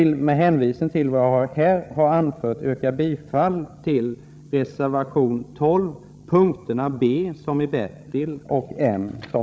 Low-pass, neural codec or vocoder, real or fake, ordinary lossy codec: none; codec, 16 kHz, 16 kbps, FreqCodec, larger model; fake; none